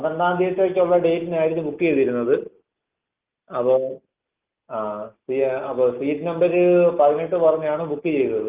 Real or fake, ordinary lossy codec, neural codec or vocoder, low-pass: real; Opus, 24 kbps; none; 3.6 kHz